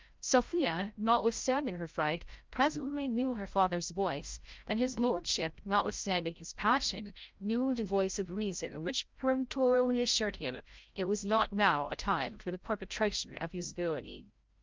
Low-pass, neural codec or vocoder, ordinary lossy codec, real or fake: 7.2 kHz; codec, 16 kHz, 0.5 kbps, FreqCodec, larger model; Opus, 32 kbps; fake